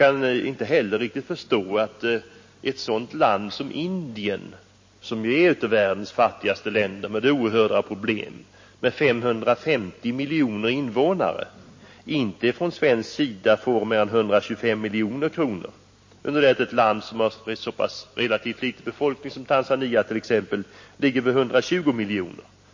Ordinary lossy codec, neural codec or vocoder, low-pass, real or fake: MP3, 32 kbps; none; 7.2 kHz; real